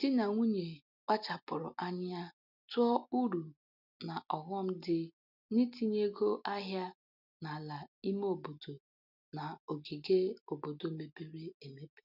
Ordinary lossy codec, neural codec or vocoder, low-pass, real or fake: none; none; 5.4 kHz; real